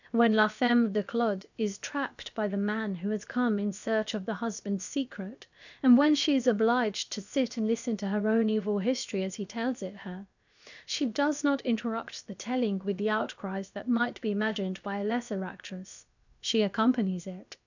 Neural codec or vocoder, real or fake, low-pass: codec, 16 kHz, about 1 kbps, DyCAST, with the encoder's durations; fake; 7.2 kHz